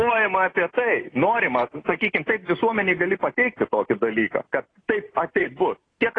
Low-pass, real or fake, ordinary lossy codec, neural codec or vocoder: 9.9 kHz; real; AAC, 32 kbps; none